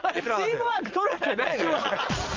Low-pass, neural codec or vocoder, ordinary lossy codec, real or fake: 7.2 kHz; vocoder, 22.05 kHz, 80 mel bands, Vocos; Opus, 24 kbps; fake